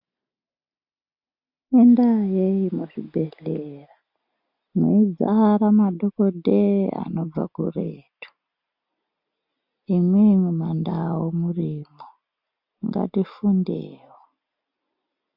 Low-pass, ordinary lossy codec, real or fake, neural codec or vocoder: 5.4 kHz; MP3, 48 kbps; real; none